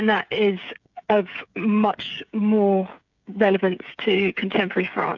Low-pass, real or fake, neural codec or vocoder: 7.2 kHz; fake; codec, 16 kHz, 16 kbps, FreqCodec, smaller model